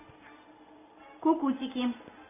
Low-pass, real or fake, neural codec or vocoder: 3.6 kHz; real; none